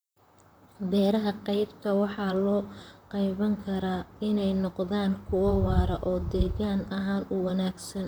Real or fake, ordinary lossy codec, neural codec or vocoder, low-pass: fake; none; vocoder, 44.1 kHz, 128 mel bands, Pupu-Vocoder; none